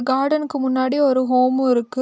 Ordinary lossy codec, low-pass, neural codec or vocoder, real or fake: none; none; none; real